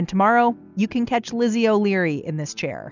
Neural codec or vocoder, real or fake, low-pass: none; real; 7.2 kHz